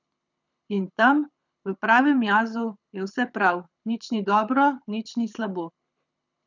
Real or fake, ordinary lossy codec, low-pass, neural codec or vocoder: fake; none; 7.2 kHz; codec, 24 kHz, 6 kbps, HILCodec